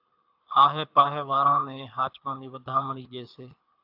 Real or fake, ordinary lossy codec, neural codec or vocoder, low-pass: fake; AAC, 48 kbps; codec, 24 kHz, 6 kbps, HILCodec; 5.4 kHz